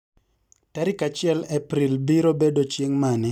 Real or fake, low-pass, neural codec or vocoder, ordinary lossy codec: real; 19.8 kHz; none; none